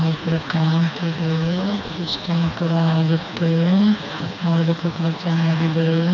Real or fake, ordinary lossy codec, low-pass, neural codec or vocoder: fake; none; 7.2 kHz; codec, 16 kHz, 2 kbps, FreqCodec, smaller model